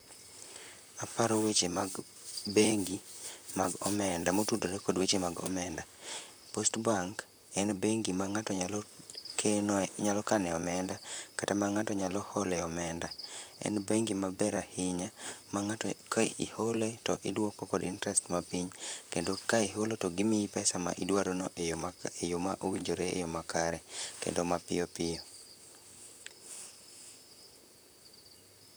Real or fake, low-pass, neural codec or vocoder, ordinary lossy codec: fake; none; vocoder, 44.1 kHz, 128 mel bands, Pupu-Vocoder; none